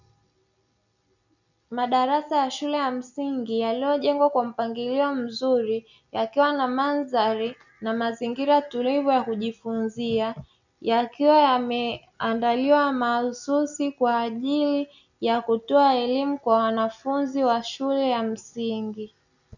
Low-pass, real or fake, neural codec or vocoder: 7.2 kHz; real; none